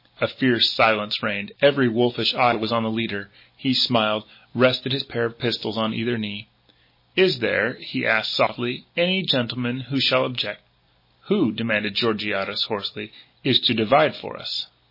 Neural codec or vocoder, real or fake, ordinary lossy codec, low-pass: none; real; MP3, 24 kbps; 5.4 kHz